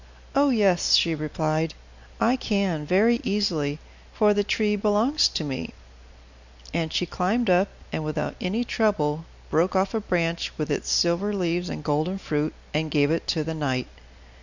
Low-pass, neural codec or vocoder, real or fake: 7.2 kHz; none; real